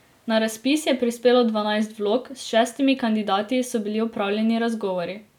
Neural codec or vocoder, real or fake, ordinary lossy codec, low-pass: none; real; none; 19.8 kHz